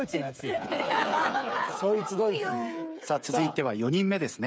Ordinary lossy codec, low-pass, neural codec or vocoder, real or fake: none; none; codec, 16 kHz, 8 kbps, FreqCodec, smaller model; fake